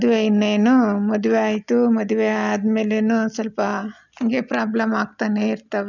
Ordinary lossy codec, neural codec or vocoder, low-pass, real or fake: none; none; 7.2 kHz; real